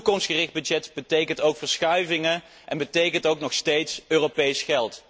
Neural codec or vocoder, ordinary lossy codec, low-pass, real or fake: none; none; none; real